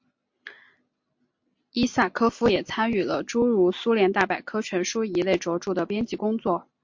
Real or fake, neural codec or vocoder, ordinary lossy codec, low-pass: real; none; MP3, 48 kbps; 7.2 kHz